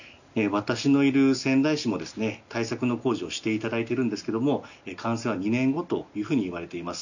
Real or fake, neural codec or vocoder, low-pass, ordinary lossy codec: real; none; 7.2 kHz; none